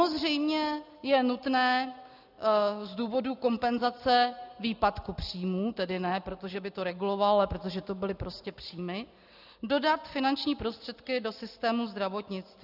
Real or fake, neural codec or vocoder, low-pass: real; none; 5.4 kHz